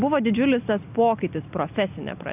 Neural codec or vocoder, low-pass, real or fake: none; 3.6 kHz; real